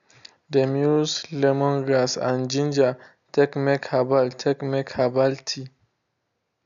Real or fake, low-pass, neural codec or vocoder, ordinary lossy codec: real; 7.2 kHz; none; MP3, 96 kbps